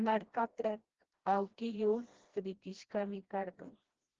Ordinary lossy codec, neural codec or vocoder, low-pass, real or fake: Opus, 16 kbps; codec, 16 kHz, 1 kbps, FreqCodec, smaller model; 7.2 kHz; fake